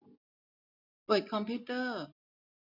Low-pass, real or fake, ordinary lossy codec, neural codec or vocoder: 5.4 kHz; real; none; none